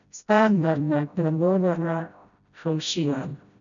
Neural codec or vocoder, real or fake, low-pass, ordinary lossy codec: codec, 16 kHz, 0.5 kbps, FreqCodec, smaller model; fake; 7.2 kHz; none